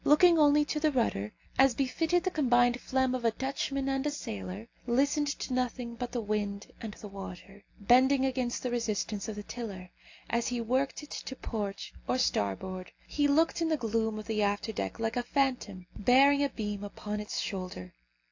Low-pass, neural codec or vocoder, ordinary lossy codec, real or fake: 7.2 kHz; none; AAC, 48 kbps; real